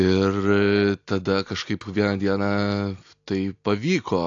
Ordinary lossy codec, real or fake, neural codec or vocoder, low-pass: AAC, 48 kbps; real; none; 7.2 kHz